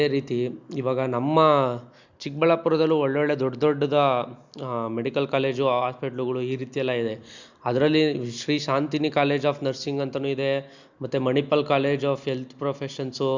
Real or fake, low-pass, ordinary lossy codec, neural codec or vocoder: real; 7.2 kHz; Opus, 64 kbps; none